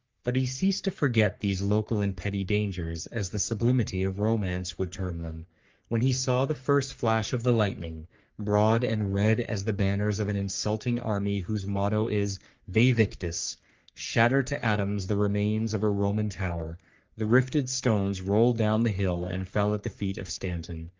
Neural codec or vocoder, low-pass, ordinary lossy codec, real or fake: codec, 44.1 kHz, 3.4 kbps, Pupu-Codec; 7.2 kHz; Opus, 32 kbps; fake